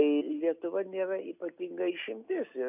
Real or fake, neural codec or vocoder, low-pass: real; none; 3.6 kHz